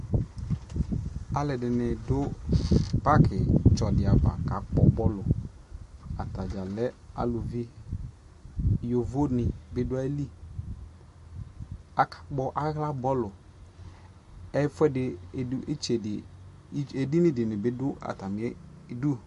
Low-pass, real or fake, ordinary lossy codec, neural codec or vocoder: 14.4 kHz; real; MP3, 48 kbps; none